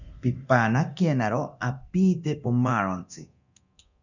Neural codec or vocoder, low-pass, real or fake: codec, 24 kHz, 0.9 kbps, DualCodec; 7.2 kHz; fake